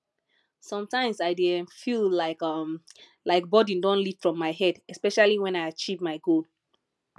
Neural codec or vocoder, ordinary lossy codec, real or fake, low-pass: none; none; real; none